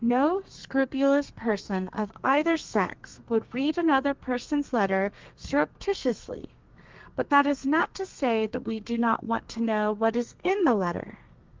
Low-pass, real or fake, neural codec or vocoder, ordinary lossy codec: 7.2 kHz; fake; codec, 32 kHz, 1.9 kbps, SNAC; Opus, 32 kbps